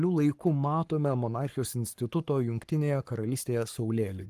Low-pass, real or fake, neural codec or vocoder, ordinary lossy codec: 14.4 kHz; fake; codec, 44.1 kHz, 7.8 kbps, Pupu-Codec; Opus, 24 kbps